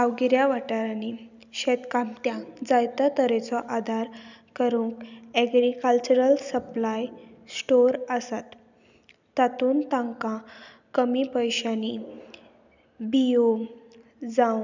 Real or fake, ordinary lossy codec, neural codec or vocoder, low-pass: real; none; none; 7.2 kHz